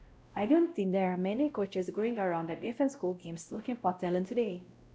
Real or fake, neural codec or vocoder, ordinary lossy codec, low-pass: fake; codec, 16 kHz, 0.5 kbps, X-Codec, WavLM features, trained on Multilingual LibriSpeech; none; none